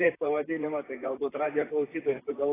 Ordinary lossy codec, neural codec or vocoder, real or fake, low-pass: AAC, 16 kbps; vocoder, 44.1 kHz, 128 mel bands, Pupu-Vocoder; fake; 3.6 kHz